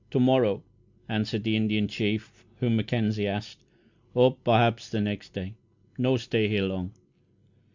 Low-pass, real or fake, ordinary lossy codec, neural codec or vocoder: 7.2 kHz; real; Opus, 64 kbps; none